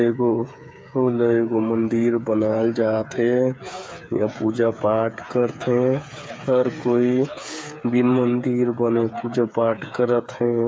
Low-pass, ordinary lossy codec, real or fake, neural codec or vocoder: none; none; fake; codec, 16 kHz, 8 kbps, FreqCodec, smaller model